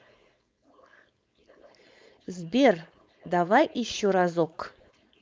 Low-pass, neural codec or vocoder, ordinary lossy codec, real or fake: none; codec, 16 kHz, 4.8 kbps, FACodec; none; fake